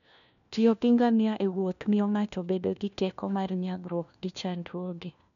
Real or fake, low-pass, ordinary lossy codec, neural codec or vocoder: fake; 7.2 kHz; none; codec, 16 kHz, 1 kbps, FunCodec, trained on LibriTTS, 50 frames a second